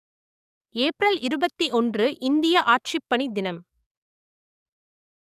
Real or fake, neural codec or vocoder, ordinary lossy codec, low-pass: fake; codec, 44.1 kHz, 7.8 kbps, DAC; none; 14.4 kHz